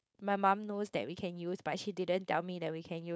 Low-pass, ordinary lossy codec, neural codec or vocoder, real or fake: none; none; codec, 16 kHz, 4.8 kbps, FACodec; fake